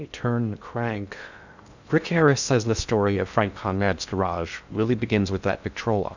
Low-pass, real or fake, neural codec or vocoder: 7.2 kHz; fake; codec, 16 kHz in and 24 kHz out, 0.6 kbps, FocalCodec, streaming, 2048 codes